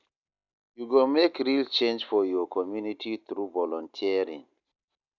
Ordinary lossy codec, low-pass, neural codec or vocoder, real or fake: none; 7.2 kHz; none; real